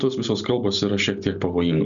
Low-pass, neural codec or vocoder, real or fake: 7.2 kHz; none; real